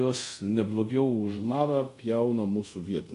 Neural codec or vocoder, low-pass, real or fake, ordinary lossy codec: codec, 24 kHz, 0.5 kbps, DualCodec; 10.8 kHz; fake; AAC, 64 kbps